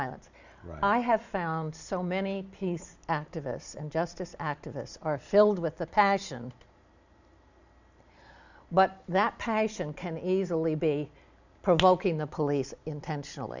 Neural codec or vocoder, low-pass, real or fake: none; 7.2 kHz; real